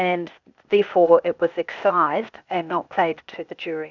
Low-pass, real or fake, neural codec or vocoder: 7.2 kHz; fake; codec, 16 kHz, 0.8 kbps, ZipCodec